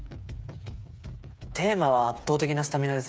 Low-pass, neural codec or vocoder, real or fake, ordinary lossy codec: none; codec, 16 kHz, 8 kbps, FreqCodec, smaller model; fake; none